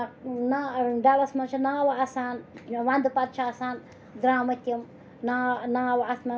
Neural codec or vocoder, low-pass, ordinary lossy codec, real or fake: none; none; none; real